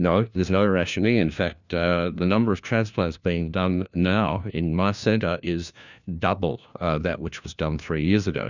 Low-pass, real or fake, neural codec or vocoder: 7.2 kHz; fake; codec, 16 kHz, 1 kbps, FunCodec, trained on LibriTTS, 50 frames a second